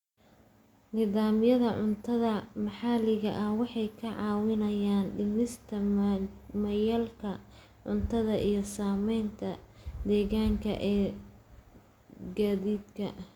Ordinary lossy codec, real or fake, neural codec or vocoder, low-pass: Opus, 64 kbps; real; none; 19.8 kHz